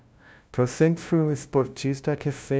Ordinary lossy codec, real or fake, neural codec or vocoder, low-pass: none; fake; codec, 16 kHz, 0.5 kbps, FunCodec, trained on LibriTTS, 25 frames a second; none